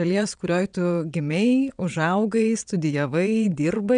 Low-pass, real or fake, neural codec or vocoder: 9.9 kHz; fake; vocoder, 22.05 kHz, 80 mel bands, WaveNeXt